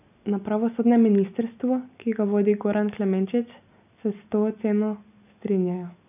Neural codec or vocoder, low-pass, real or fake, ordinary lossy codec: none; 3.6 kHz; real; none